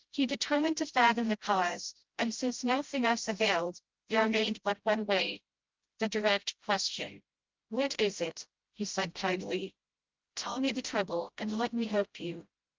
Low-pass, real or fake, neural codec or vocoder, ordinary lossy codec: 7.2 kHz; fake; codec, 16 kHz, 0.5 kbps, FreqCodec, smaller model; Opus, 24 kbps